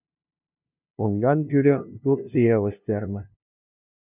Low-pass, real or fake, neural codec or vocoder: 3.6 kHz; fake; codec, 16 kHz, 0.5 kbps, FunCodec, trained on LibriTTS, 25 frames a second